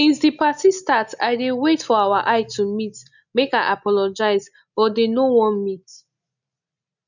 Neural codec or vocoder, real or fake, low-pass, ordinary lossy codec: none; real; 7.2 kHz; none